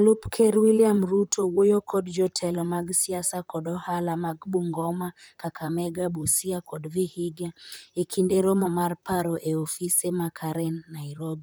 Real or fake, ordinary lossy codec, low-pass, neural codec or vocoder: fake; none; none; vocoder, 44.1 kHz, 128 mel bands, Pupu-Vocoder